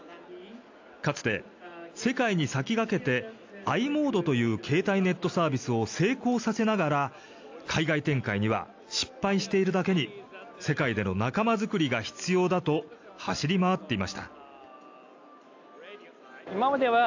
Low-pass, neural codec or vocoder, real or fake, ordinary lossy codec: 7.2 kHz; none; real; AAC, 48 kbps